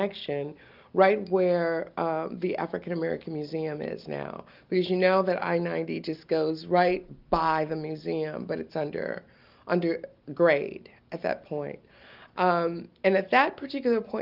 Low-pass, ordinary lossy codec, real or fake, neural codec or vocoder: 5.4 kHz; Opus, 32 kbps; real; none